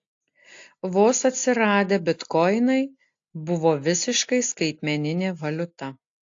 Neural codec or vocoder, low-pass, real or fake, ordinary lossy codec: none; 7.2 kHz; real; AAC, 48 kbps